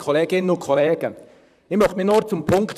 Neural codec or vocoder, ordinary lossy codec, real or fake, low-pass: vocoder, 44.1 kHz, 128 mel bands, Pupu-Vocoder; none; fake; 14.4 kHz